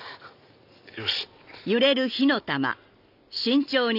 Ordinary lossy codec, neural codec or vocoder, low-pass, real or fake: none; none; 5.4 kHz; real